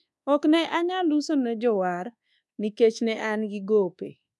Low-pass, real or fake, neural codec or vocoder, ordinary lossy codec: none; fake; codec, 24 kHz, 1.2 kbps, DualCodec; none